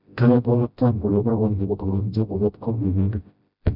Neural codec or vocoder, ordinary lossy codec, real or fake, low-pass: codec, 16 kHz, 0.5 kbps, FreqCodec, smaller model; none; fake; 5.4 kHz